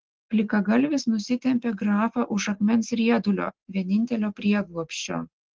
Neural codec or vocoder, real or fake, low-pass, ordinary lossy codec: none; real; 7.2 kHz; Opus, 16 kbps